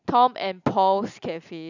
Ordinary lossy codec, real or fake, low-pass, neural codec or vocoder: none; real; 7.2 kHz; none